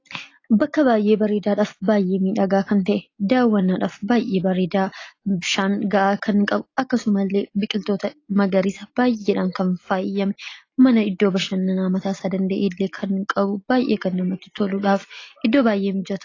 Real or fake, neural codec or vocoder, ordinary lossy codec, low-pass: real; none; AAC, 32 kbps; 7.2 kHz